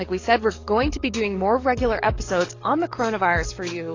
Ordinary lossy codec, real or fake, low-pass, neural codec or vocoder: AAC, 32 kbps; fake; 7.2 kHz; codec, 44.1 kHz, 7.8 kbps, DAC